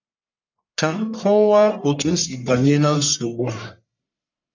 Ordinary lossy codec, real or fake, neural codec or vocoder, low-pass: none; fake; codec, 44.1 kHz, 1.7 kbps, Pupu-Codec; 7.2 kHz